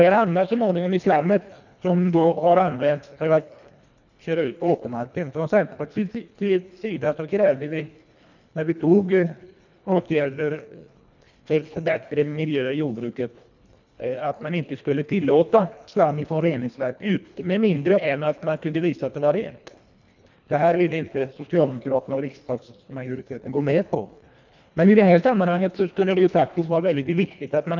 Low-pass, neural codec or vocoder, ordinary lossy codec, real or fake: 7.2 kHz; codec, 24 kHz, 1.5 kbps, HILCodec; none; fake